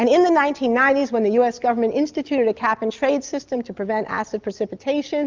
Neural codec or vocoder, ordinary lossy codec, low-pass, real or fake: none; Opus, 32 kbps; 7.2 kHz; real